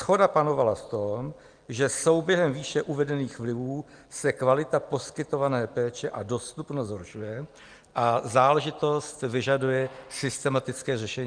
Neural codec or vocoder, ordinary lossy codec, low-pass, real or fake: none; Opus, 32 kbps; 9.9 kHz; real